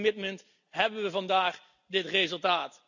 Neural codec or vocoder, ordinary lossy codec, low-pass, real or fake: none; none; 7.2 kHz; real